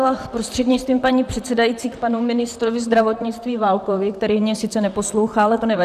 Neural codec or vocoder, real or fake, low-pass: vocoder, 44.1 kHz, 128 mel bands, Pupu-Vocoder; fake; 14.4 kHz